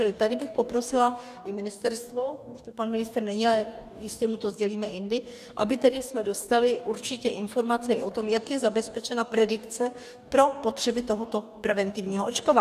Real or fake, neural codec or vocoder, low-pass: fake; codec, 44.1 kHz, 2.6 kbps, DAC; 14.4 kHz